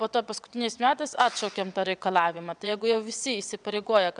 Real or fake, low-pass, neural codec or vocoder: fake; 9.9 kHz; vocoder, 22.05 kHz, 80 mel bands, WaveNeXt